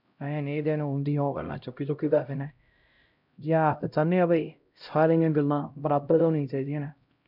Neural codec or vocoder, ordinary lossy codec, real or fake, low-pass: codec, 16 kHz, 0.5 kbps, X-Codec, HuBERT features, trained on LibriSpeech; none; fake; 5.4 kHz